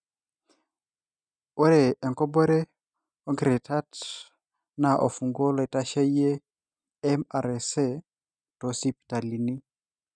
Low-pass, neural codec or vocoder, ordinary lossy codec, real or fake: 9.9 kHz; none; none; real